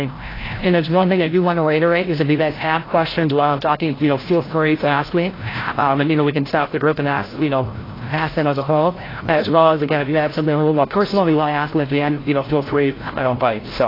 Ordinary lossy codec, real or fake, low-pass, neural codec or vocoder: AAC, 24 kbps; fake; 5.4 kHz; codec, 16 kHz, 0.5 kbps, FreqCodec, larger model